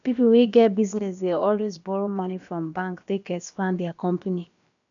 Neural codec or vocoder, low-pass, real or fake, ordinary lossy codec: codec, 16 kHz, about 1 kbps, DyCAST, with the encoder's durations; 7.2 kHz; fake; none